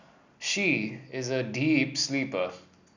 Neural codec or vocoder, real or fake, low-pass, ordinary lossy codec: none; real; 7.2 kHz; none